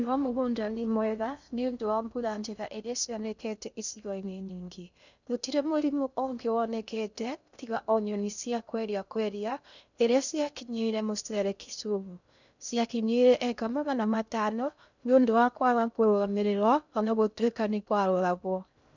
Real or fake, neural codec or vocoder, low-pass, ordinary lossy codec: fake; codec, 16 kHz in and 24 kHz out, 0.6 kbps, FocalCodec, streaming, 2048 codes; 7.2 kHz; none